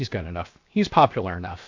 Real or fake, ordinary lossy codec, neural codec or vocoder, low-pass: fake; AAC, 48 kbps; codec, 16 kHz, 0.7 kbps, FocalCodec; 7.2 kHz